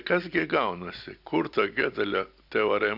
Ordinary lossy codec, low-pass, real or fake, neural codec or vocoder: AAC, 48 kbps; 5.4 kHz; real; none